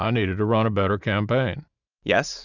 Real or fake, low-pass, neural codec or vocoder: real; 7.2 kHz; none